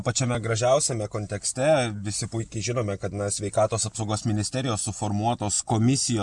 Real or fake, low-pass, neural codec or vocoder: real; 10.8 kHz; none